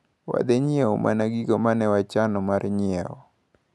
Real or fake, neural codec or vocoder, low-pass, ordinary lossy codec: real; none; none; none